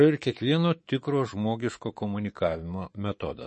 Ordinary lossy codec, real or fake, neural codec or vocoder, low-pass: MP3, 32 kbps; fake; codec, 24 kHz, 3.1 kbps, DualCodec; 10.8 kHz